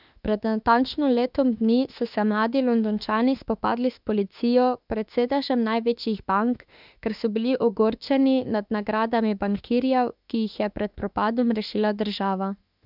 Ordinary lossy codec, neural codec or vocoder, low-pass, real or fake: none; autoencoder, 48 kHz, 32 numbers a frame, DAC-VAE, trained on Japanese speech; 5.4 kHz; fake